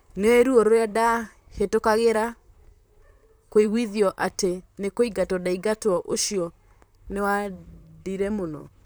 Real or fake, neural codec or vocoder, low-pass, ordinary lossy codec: fake; vocoder, 44.1 kHz, 128 mel bands, Pupu-Vocoder; none; none